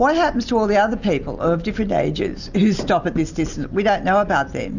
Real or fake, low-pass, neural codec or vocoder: real; 7.2 kHz; none